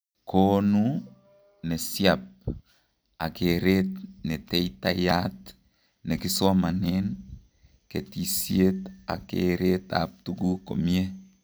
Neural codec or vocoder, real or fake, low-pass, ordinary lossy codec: none; real; none; none